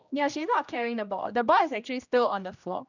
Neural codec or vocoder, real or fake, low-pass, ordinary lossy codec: codec, 16 kHz, 1 kbps, X-Codec, HuBERT features, trained on general audio; fake; 7.2 kHz; none